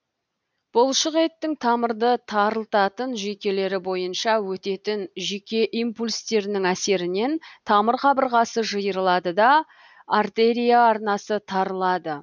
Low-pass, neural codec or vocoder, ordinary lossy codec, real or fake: 7.2 kHz; none; none; real